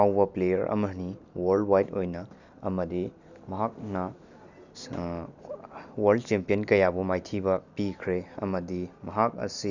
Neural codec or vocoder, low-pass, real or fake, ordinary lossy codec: none; 7.2 kHz; real; none